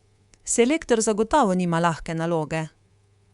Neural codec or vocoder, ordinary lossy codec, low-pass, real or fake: codec, 24 kHz, 3.1 kbps, DualCodec; Opus, 64 kbps; 10.8 kHz; fake